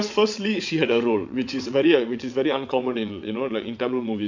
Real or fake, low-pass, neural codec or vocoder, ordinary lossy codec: fake; 7.2 kHz; vocoder, 22.05 kHz, 80 mel bands, WaveNeXt; MP3, 64 kbps